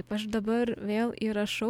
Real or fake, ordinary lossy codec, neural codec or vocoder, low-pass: real; MP3, 96 kbps; none; 19.8 kHz